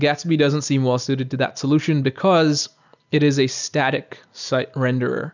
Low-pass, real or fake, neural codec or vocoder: 7.2 kHz; real; none